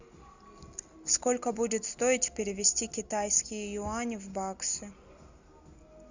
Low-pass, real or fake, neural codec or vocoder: 7.2 kHz; real; none